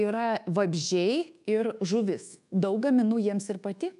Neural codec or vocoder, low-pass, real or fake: codec, 24 kHz, 1.2 kbps, DualCodec; 10.8 kHz; fake